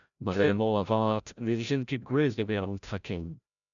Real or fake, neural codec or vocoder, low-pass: fake; codec, 16 kHz, 0.5 kbps, FreqCodec, larger model; 7.2 kHz